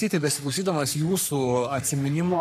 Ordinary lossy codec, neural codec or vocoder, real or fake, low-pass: AAC, 96 kbps; codec, 44.1 kHz, 3.4 kbps, Pupu-Codec; fake; 14.4 kHz